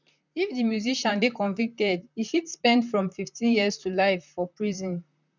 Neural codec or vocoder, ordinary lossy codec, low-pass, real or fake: vocoder, 44.1 kHz, 128 mel bands, Pupu-Vocoder; none; 7.2 kHz; fake